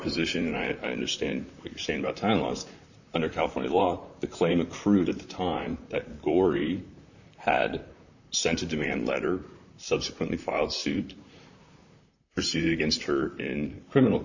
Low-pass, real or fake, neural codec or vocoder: 7.2 kHz; fake; vocoder, 44.1 kHz, 128 mel bands, Pupu-Vocoder